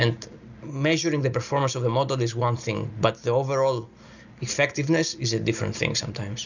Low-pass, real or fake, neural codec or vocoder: 7.2 kHz; real; none